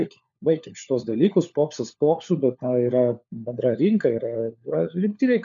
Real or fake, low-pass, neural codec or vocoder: fake; 7.2 kHz; codec, 16 kHz, 4 kbps, FunCodec, trained on LibriTTS, 50 frames a second